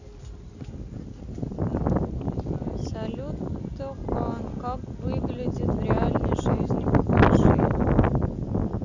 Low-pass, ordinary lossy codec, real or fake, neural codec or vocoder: 7.2 kHz; none; real; none